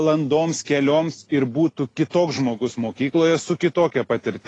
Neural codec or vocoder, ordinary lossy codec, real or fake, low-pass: none; AAC, 32 kbps; real; 10.8 kHz